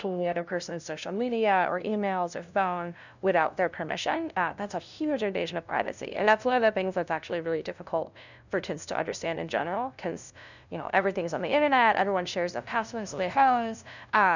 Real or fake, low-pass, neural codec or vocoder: fake; 7.2 kHz; codec, 16 kHz, 0.5 kbps, FunCodec, trained on LibriTTS, 25 frames a second